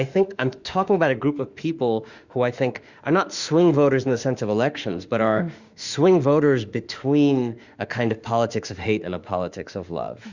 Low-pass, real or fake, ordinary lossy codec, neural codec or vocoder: 7.2 kHz; fake; Opus, 64 kbps; autoencoder, 48 kHz, 32 numbers a frame, DAC-VAE, trained on Japanese speech